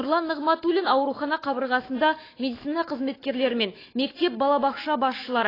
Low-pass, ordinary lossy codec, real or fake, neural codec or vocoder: 5.4 kHz; AAC, 24 kbps; fake; autoencoder, 48 kHz, 128 numbers a frame, DAC-VAE, trained on Japanese speech